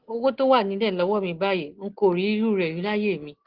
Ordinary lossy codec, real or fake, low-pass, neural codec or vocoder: Opus, 16 kbps; real; 5.4 kHz; none